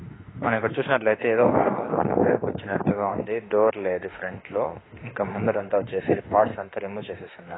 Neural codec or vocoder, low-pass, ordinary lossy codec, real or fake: autoencoder, 48 kHz, 32 numbers a frame, DAC-VAE, trained on Japanese speech; 7.2 kHz; AAC, 16 kbps; fake